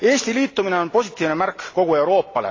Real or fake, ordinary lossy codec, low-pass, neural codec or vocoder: real; AAC, 32 kbps; 7.2 kHz; none